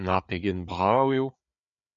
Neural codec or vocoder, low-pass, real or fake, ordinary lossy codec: codec, 16 kHz, 4 kbps, FreqCodec, larger model; 7.2 kHz; fake; MP3, 96 kbps